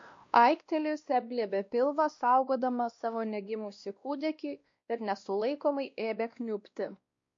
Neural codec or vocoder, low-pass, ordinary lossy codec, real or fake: codec, 16 kHz, 1 kbps, X-Codec, WavLM features, trained on Multilingual LibriSpeech; 7.2 kHz; MP3, 48 kbps; fake